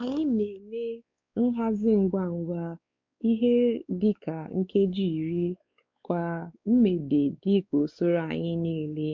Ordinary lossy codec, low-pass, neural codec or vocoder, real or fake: MP3, 64 kbps; 7.2 kHz; codec, 16 kHz, 4 kbps, X-Codec, WavLM features, trained on Multilingual LibriSpeech; fake